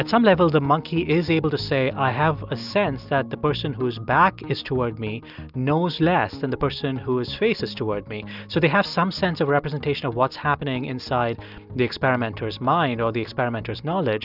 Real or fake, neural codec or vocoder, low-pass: real; none; 5.4 kHz